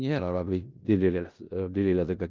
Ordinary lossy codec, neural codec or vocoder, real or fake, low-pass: Opus, 24 kbps; codec, 16 kHz in and 24 kHz out, 0.4 kbps, LongCat-Audio-Codec, four codebook decoder; fake; 7.2 kHz